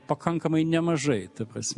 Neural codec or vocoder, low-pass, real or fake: none; 10.8 kHz; real